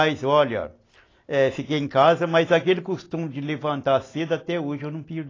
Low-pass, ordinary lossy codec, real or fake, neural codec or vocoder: 7.2 kHz; AAC, 32 kbps; real; none